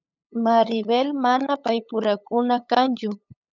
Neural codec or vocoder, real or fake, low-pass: codec, 16 kHz, 8 kbps, FunCodec, trained on LibriTTS, 25 frames a second; fake; 7.2 kHz